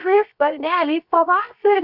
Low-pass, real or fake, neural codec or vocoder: 5.4 kHz; fake; codec, 16 kHz, about 1 kbps, DyCAST, with the encoder's durations